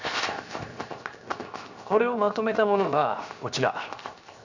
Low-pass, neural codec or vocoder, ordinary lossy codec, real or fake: 7.2 kHz; codec, 16 kHz, 0.7 kbps, FocalCodec; none; fake